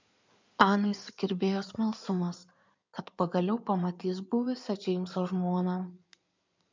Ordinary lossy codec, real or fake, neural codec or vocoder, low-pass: MP3, 64 kbps; fake; codec, 16 kHz in and 24 kHz out, 2.2 kbps, FireRedTTS-2 codec; 7.2 kHz